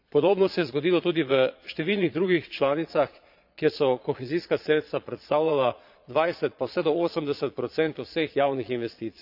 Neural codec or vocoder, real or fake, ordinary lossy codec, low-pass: vocoder, 22.05 kHz, 80 mel bands, Vocos; fake; AAC, 48 kbps; 5.4 kHz